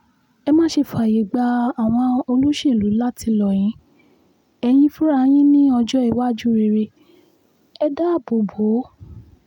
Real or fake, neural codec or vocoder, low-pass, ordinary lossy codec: real; none; 19.8 kHz; none